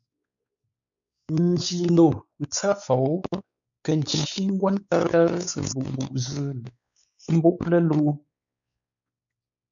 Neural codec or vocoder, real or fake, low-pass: codec, 16 kHz, 4 kbps, X-Codec, WavLM features, trained on Multilingual LibriSpeech; fake; 7.2 kHz